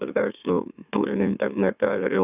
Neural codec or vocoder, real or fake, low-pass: autoencoder, 44.1 kHz, a latent of 192 numbers a frame, MeloTTS; fake; 3.6 kHz